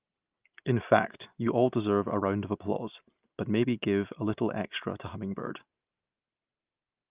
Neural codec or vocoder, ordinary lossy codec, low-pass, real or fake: none; Opus, 24 kbps; 3.6 kHz; real